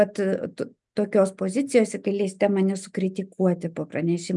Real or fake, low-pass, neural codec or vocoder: real; 10.8 kHz; none